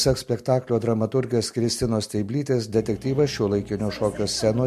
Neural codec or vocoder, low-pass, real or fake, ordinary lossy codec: none; 14.4 kHz; real; MP3, 64 kbps